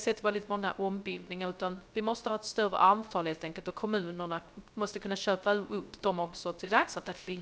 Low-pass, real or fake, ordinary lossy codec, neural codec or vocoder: none; fake; none; codec, 16 kHz, 0.3 kbps, FocalCodec